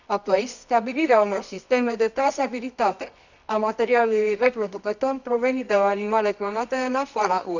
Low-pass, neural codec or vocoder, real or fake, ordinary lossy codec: 7.2 kHz; codec, 24 kHz, 0.9 kbps, WavTokenizer, medium music audio release; fake; none